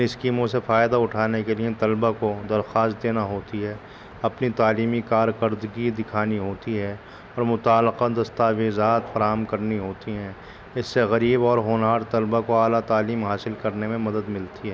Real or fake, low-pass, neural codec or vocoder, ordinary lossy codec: real; none; none; none